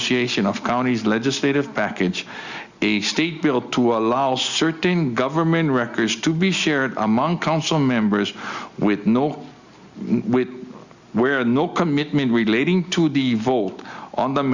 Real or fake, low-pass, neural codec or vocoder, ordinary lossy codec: real; 7.2 kHz; none; Opus, 64 kbps